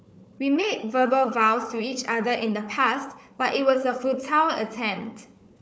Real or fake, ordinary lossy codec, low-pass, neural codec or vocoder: fake; none; none; codec, 16 kHz, 4 kbps, FunCodec, trained on Chinese and English, 50 frames a second